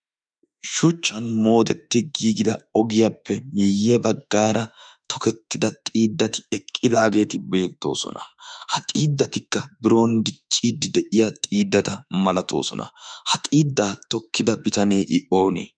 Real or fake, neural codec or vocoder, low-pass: fake; autoencoder, 48 kHz, 32 numbers a frame, DAC-VAE, trained on Japanese speech; 9.9 kHz